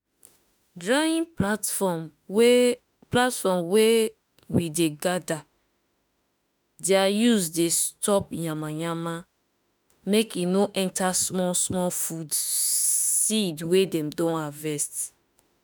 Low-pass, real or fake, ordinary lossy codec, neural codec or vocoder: none; fake; none; autoencoder, 48 kHz, 32 numbers a frame, DAC-VAE, trained on Japanese speech